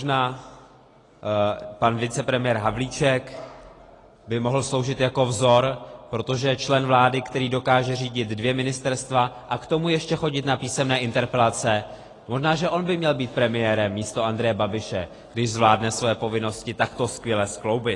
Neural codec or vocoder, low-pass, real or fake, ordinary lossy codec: none; 10.8 kHz; real; AAC, 32 kbps